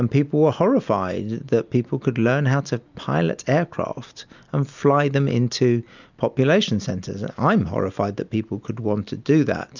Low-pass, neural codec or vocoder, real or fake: 7.2 kHz; none; real